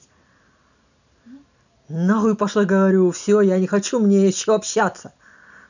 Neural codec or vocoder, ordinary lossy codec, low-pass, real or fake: none; none; 7.2 kHz; real